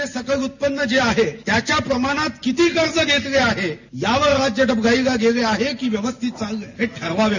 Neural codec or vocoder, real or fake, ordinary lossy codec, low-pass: none; real; none; 7.2 kHz